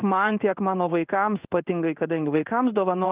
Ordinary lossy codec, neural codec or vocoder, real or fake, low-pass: Opus, 24 kbps; codec, 16 kHz in and 24 kHz out, 1 kbps, XY-Tokenizer; fake; 3.6 kHz